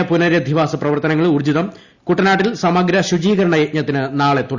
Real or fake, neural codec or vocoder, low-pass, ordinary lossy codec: real; none; 7.2 kHz; Opus, 64 kbps